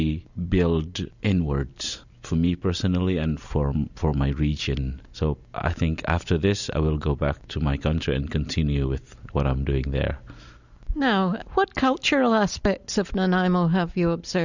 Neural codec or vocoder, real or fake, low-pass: none; real; 7.2 kHz